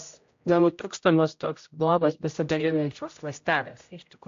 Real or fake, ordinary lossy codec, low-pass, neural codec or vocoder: fake; AAC, 48 kbps; 7.2 kHz; codec, 16 kHz, 0.5 kbps, X-Codec, HuBERT features, trained on general audio